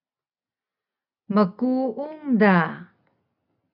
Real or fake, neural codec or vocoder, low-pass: real; none; 5.4 kHz